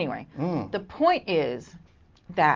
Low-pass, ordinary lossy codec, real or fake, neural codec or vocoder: 7.2 kHz; Opus, 32 kbps; real; none